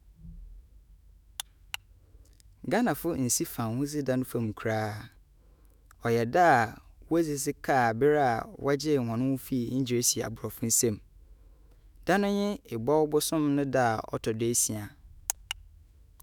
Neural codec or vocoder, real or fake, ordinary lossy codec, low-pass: autoencoder, 48 kHz, 128 numbers a frame, DAC-VAE, trained on Japanese speech; fake; none; none